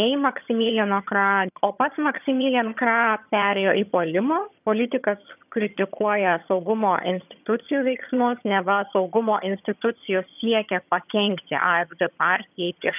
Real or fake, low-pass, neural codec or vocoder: fake; 3.6 kHz; vocoder, 22.05 kHz, 80 mel bands, HiFi-GAN